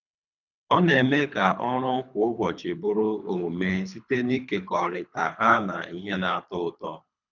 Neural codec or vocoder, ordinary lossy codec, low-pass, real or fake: codec, 24 kHz, 3 kbps, HILCodec; none; 7.2 kHz; fake